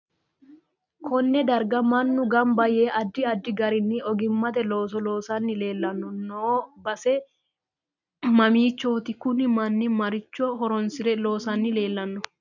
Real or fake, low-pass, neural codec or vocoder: real; 7.2 kHz; none